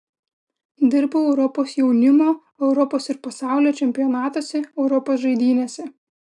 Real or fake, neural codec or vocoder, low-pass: real; none; 10.8 kHz